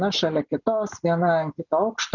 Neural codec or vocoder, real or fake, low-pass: none; real; 7.2 kHz